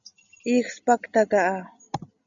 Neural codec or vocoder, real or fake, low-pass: none; real; 7.2 kHz